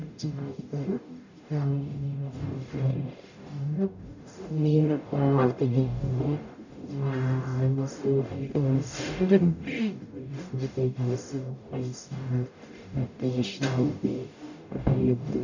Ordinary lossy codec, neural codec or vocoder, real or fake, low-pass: none; codec, 44.1 kHz, 0.9 kbps, DAC; fake; 7.2 kHz